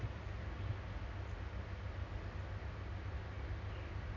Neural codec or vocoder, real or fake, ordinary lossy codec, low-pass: none; real; none; 7.2 kHz